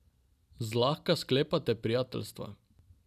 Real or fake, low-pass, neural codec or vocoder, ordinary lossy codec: real; 14.4 kHz; none; none